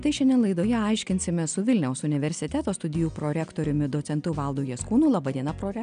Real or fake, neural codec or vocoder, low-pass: real; none; 9.9 kHz